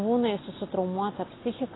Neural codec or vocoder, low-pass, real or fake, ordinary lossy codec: none; 7.2 kHz; real; AAC, 16 kbps